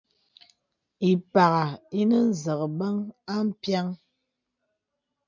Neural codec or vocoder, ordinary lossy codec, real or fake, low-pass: none; MP3, 64 kbps; real; 7.2 kHz